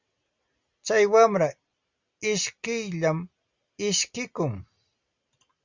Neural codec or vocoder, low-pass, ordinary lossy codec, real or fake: none; 7.2 kHz; Opus, 64 kbps; real